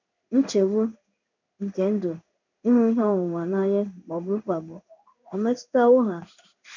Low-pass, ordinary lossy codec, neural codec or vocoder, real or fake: 7.2 kHz; none; codec, 16 kHz in and 24 kHz out, 1 kbps, XY-Tokenizer; fake